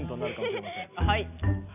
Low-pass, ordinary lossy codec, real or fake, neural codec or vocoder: 3.6 kHz; none; real; none